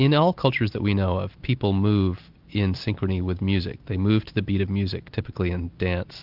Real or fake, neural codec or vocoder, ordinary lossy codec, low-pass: real; none; Opus, 24 kbps; 5.4 kHz